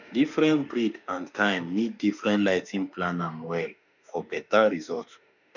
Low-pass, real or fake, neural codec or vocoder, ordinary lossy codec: 7.2 kHz; fake; autoencoder, 48 kHz, 32 numbers a frame, DAC-VAE, trained on Japanese speech; none